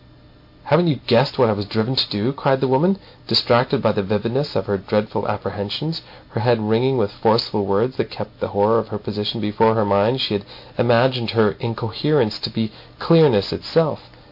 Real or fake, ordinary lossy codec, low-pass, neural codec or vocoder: real; MP3, 32 kbps; 5.4 kHz; none